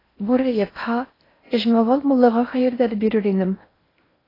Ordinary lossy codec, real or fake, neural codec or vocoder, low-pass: AAC, 24 kbps; fake; codec, 16 kHz in and 24 kHz out, 0.6 kbps, FocalCodec, streaming, 4096 codes; 5.4 kHz